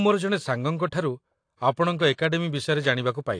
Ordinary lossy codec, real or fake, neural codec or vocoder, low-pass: AAC, 48 kbps; real; none; 9.9 kHz